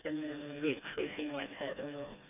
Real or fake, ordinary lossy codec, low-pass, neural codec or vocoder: fake; none; 3.6 kHz; codec, 16 kHz, 2 kbps, FreqCodec, smaller model